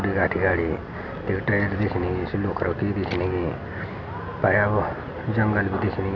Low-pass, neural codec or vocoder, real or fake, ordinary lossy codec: 7.2 kHz; none; real; none